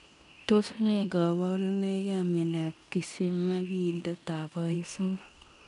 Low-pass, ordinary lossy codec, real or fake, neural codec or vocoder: 10.8 kHz; none; fake; codec, 16 kHz in and 24 kHz out, 0.9 kbps, LongCat-Audio-Codec, fine tuned four codebook decoder